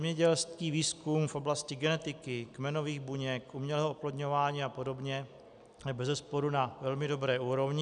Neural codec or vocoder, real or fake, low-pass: none; real; 9.9 kHz